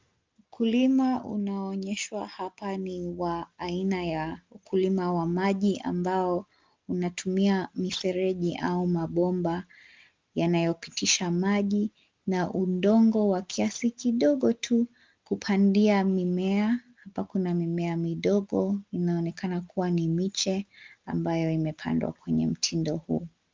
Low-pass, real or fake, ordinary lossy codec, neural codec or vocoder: 7.2 kHz; real; Opus, 24 kbps; none